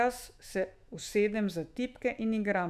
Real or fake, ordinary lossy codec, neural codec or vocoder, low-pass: fake; none; autoencoder, 48 kHz, 128 numbers a frame, DAC-VAE, trained on Japanese speech; 14.4 kHz